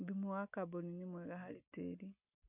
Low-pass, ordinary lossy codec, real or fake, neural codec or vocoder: 3.6 kHz; none; real; none